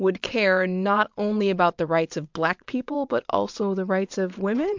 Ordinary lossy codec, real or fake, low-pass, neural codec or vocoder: MP3, 64 kbps; fake; 7.2 kHz; vocoder, 22.05 kHz, 80 mel bands, WaveNeXt